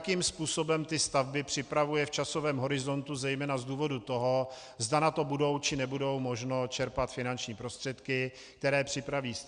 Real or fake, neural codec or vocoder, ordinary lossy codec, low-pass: real; none; Opus, 64 kbps; 9.9 kHz